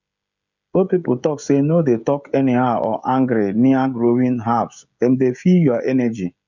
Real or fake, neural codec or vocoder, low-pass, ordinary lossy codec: fake; codec, 16 kHz, 16 kbps, FreqCodec, smaller model; 7.2 kHz; none